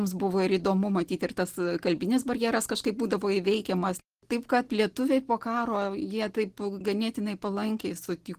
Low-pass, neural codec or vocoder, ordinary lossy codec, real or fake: 14.4 kHz; none; Opus, 16 kbps; real